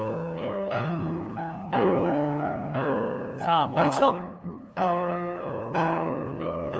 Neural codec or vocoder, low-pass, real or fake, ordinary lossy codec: codec, 16 kHz, 2 kbps, FunCodec, trained on LibriTTS, 25 frames a second; none; fake; none